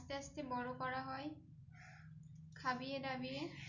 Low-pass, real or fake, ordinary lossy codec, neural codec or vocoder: 7.2 kHz; real; none; none